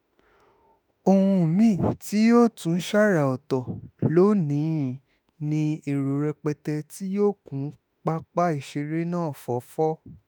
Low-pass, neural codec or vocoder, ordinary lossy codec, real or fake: none; autoencoder, 48 kHz, 32 numbers a frame, DAC-VAE, trained on Japanese speech; none; fake